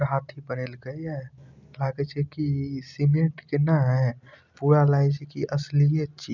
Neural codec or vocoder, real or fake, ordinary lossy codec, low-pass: none; real; none; 7.2 kHz